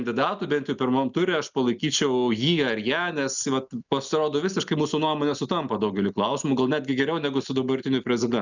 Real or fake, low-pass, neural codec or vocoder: real; 7.2 kHz; none